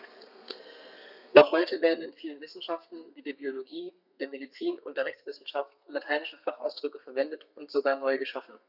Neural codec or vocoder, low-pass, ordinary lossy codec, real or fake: codec, 32 kHz, 1.9 kbps, SNAC; 5.4 kHz; none; fake